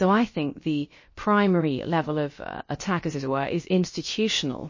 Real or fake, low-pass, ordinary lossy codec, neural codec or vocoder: fake; 7.2 kHz; MP3, 32 kbps; codec, 16 kHz, about 1 kbps, DyCAST, with the encoder's durations